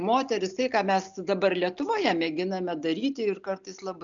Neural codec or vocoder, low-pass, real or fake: none; 7.2 kHz; real